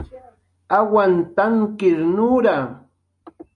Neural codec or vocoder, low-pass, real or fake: none; 10.8 kHz; real